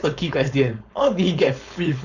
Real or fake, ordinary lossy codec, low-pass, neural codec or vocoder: fake; none; 7.2 kHz; codec, 16 kHz, 4.8 kbps, FACodec